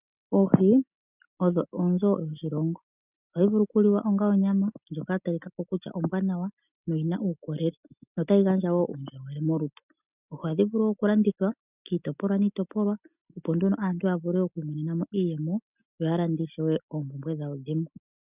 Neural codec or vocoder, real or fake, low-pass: none; real; 3.6 kHz